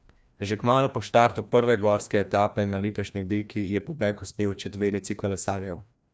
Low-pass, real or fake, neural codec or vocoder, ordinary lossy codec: none; fake; codec, 16 kHz, 1 kbps, FreqCodec, larger model; none